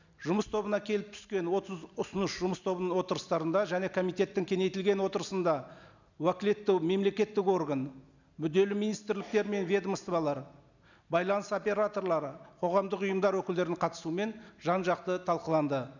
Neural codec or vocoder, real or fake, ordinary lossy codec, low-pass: none; real; none; 7.2 kHz